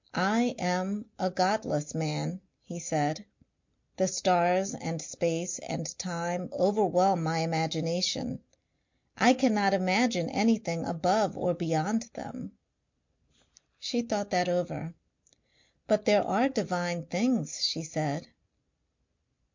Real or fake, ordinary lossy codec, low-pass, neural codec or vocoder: real; MP3, 48 kbps; 7.2 kHz; none